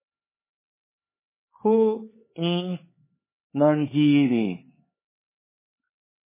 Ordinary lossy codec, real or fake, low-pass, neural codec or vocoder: MP3, 16 kbps; fake; 3.6 kHz; codec, 16 kHz, 4 kbps, X-Codec, HuBERT features, trained on LibriSpeech